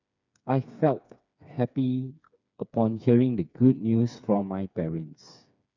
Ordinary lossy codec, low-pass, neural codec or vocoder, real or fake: none; 7.2 kHz; codec, 16 kHz, 4 kbps, FreqCodec, smaller model; fake